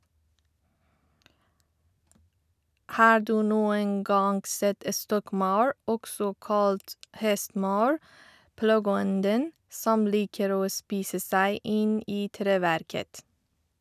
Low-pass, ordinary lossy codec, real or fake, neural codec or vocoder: 14.4 kHz; none; real; none